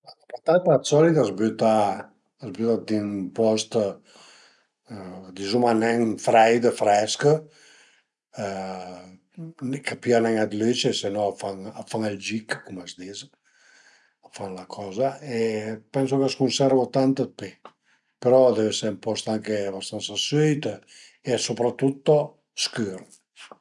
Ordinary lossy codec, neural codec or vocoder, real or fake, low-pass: none; none; real; 10.8 kHz